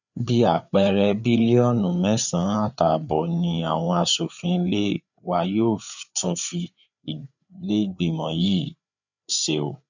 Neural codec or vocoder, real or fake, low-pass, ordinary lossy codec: codec, 16 kHz, 4 kbps, FreqCodec, larger model; fake; 7.2 kHz; none